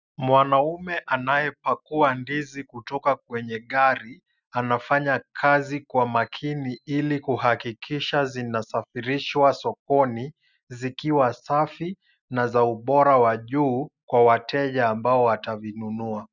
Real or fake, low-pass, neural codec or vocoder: real; 7.2 kHz; none